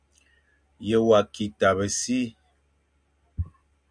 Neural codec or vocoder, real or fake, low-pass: none; real; 9.9 kHz